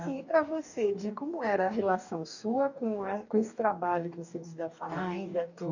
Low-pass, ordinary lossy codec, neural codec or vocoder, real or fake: 7.2 kHz; none; codec, 44.1 kHz, 2.6 kbps, DAC; fake